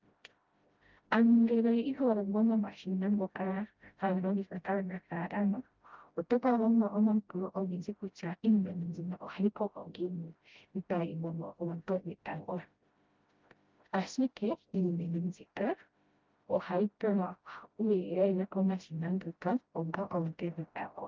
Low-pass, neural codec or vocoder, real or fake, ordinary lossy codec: 7.2 kHz; codec, 16 kHz, 0.5 kbps, FreqCodec, smaller model; fake; Opus, 32 kbps